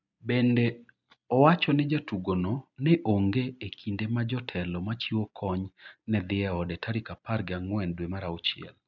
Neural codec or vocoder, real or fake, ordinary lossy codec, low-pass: none; real; none; 7.2 kHz